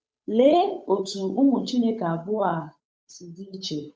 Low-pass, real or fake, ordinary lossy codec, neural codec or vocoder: none; fake; none; codec, 16 kHz, 8 kbps, FunCodec, trained on Chinese and English, 25 frames a second